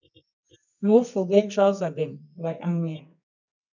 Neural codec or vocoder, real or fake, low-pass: codec, 24 kHz, 0.9 kbps, WavTokenizer, medium music audio release; fake; 7.2 kHz